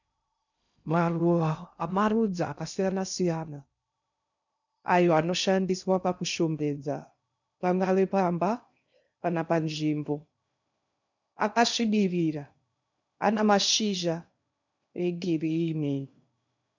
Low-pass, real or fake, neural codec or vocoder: 7.2 kHz; fake; codec, 16 kHz in and 24 kHz out, 0.6 kbps, FocalCodec, streaming, 2048 codes